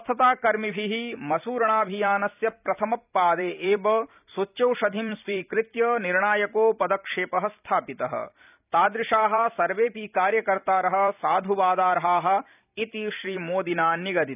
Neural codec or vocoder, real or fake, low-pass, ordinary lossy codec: vocoder, 44.1 kHz, 128 mel bands every 256 samples, BigVGAN v2; fake; 3.6 kHz; none